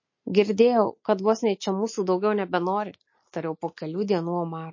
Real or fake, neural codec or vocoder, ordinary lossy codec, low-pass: real; none; MP3, 32 kbps; 7.2 kHz